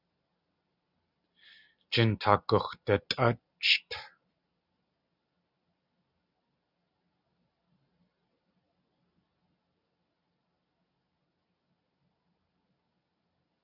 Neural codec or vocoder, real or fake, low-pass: none; real; 5.4 kHz